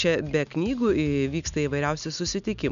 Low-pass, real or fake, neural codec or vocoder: 7.2 kHz; real; none